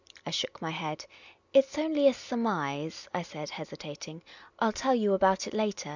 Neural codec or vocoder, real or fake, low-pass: none; real; 7.2 kHz